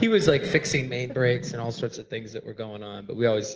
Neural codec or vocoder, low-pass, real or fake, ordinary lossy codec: none; 7.2 kHz; real; Opus, 16 kbps